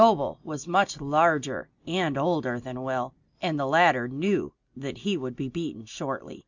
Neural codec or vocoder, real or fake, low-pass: none; real; 7.2 kHz